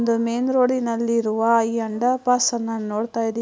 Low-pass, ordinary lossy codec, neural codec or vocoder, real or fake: none; none; none; real